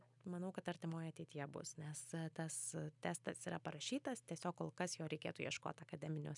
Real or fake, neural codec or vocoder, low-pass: real; none; 10.8 kHz